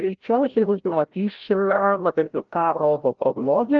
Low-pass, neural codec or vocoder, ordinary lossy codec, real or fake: 7.2 kHz; codec, 16 kHz, 0.5 kbps, FreqCodec, larger model; Opus, 16 kbps; fake